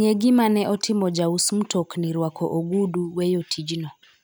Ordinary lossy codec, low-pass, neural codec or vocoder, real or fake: none; none; none; real